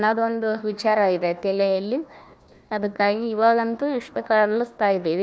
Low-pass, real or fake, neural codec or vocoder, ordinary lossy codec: none; fake; codec, 16 kHz, 1 kbps, FunCodec, trained on Chinese and English, 50 frames a second; none